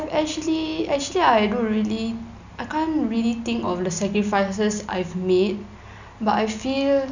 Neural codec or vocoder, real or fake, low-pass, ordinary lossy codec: none; real; 7.2 kHz; none